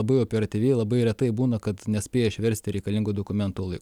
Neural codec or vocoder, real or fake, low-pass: none; real; 19.8 kHz